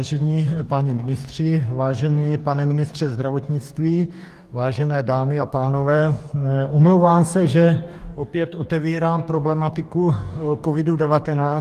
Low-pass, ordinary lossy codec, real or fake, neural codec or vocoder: 14.4 kHz; Opus, 32 kbps; fake; codec, 44.1 kHz, 2.6 kbps, DAC